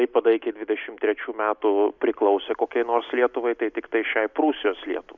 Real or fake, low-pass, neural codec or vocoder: real; 7.2 kHz; none